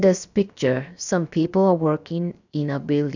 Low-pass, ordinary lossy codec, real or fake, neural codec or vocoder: 7.2 kHz; none; fake; codec, 16 kHz, 0.7 kbps, FocalCodec